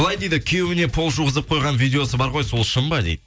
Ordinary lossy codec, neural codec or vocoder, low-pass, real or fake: none; none; none; real